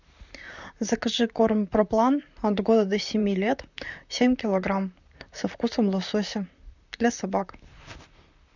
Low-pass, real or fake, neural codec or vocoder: 7.2 kHz; fake; vocoder, 44.1 kHz, 128 mel bands, Pupu-Vocoder